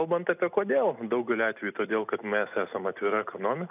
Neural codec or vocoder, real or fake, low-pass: none; real; 3.6 kHz